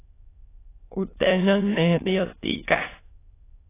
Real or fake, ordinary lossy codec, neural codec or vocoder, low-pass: fake; AAC, 16 kbps; autoencoder, 22.05 kHz, a latent of 192 numbers a frame, VITS, trained on many speakers; 3.6 kHz